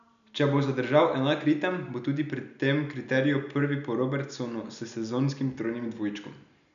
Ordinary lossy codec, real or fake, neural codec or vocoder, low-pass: none; real; none; 7.2 kHz